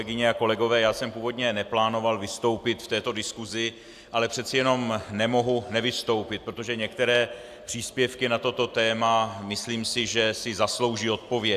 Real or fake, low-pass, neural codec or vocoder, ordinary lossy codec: real; 14.4 kHz; none; AAC, 96 kbps